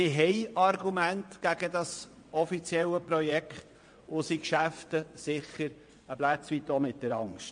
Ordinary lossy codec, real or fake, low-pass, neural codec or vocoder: MP3, 48 kbps; fake; 9.9 kHz; vocoder, 22.05 kHz, 80 mel bands, WaveNeXt